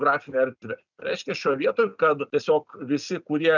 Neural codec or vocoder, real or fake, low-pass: none; real; 7.2 kHz